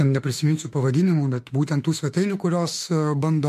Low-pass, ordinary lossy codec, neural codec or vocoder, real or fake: 14.4 kHz; MP3, 64 kbps; autoencoder, 48 kHz, 32 numbers a frame, DAC-VAE, trained on Japanese speech; fake